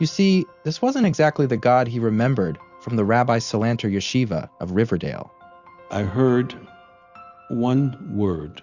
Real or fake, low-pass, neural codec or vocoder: real; 7.2 kHz; none